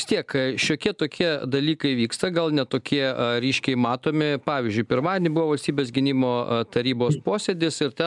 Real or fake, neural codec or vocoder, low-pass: real; none; 10.8 kHz